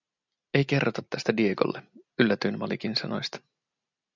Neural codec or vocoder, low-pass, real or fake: none; 7.2 kHz; real